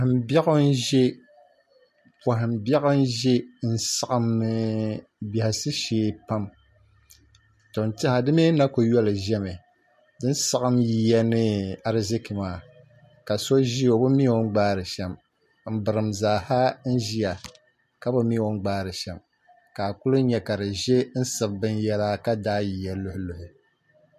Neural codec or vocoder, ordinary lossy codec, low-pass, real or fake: none; MP3, 64 kbps; 14.4 kHz; real